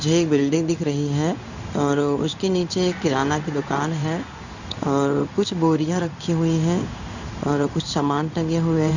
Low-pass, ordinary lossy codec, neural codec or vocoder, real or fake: 7.2 kHz; none; codec, 16 kHz in and 24 kHz out, 1 kbps, XY-Tokenizer; fake